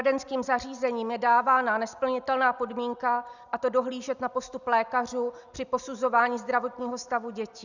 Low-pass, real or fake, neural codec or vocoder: 7.2 kHz; real; none